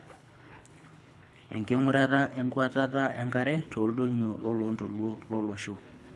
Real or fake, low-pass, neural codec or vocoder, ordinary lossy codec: fake; none; codec, 24 kHz, 3 kbps, HILCodec; none